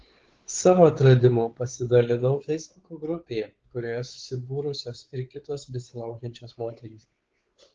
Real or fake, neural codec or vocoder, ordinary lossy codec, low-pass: fake; codec, 16 kHz, 4 kbps, X-Codec, WavLM features, trained on Multilingual LibriSpeech; Opus, 16 kbps; 7.2 kHz